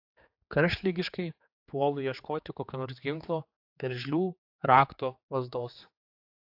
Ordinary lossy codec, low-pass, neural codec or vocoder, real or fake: AAC, 48 kbps; 5.4 kHz; codec, 16 kHz, 4 kbps, X-Codec, HuBERT features, trained on general audio; fake